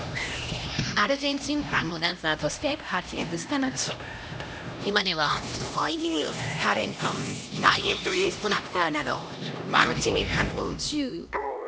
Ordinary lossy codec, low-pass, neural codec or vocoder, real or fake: none; none; codec, 16 kHz, 1 kbps, X-Codec, HuBERT features, trained on LibriSpeech; fake